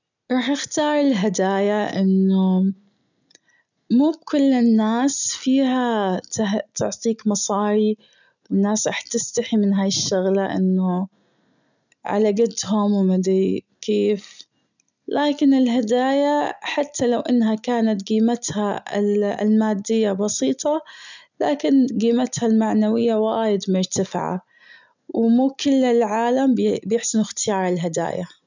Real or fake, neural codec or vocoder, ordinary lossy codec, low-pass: real; none; none; 7.2 kHz